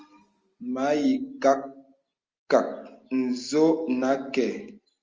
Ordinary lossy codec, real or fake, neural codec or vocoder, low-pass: Opus, 24 kbps; real; none; 7.2 kHz